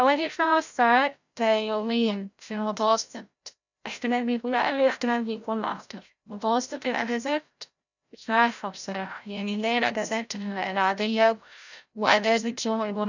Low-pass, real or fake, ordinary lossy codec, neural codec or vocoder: 7.2 kHz; fake; none; codec, 16 kHz, 0.5 kbps, FreqCodec, larger model